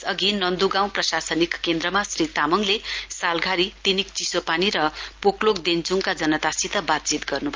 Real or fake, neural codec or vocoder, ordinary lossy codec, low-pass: real; none; Opus, 24 kbps; 7.2 kHz